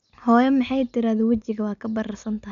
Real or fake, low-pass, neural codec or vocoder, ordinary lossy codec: real; 7.2 kHz; none; none